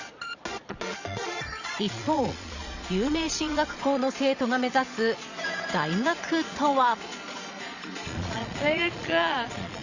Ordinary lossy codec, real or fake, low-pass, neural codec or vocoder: Opus, 64 kbps; fake; 7.2 kHz; vocoder, 22.05 kHz, 80 mel bands, Vocos